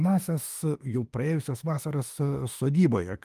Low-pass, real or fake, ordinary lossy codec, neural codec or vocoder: 14.4 kHz; fake; Opus, 24 kbps; autoencoder, 48 kHz, 32 numbers a frame, DAC-VAE, trained on Japanese speech